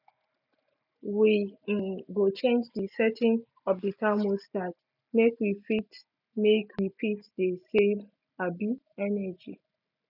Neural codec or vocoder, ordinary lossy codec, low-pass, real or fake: none; none; 5.4 kHz; real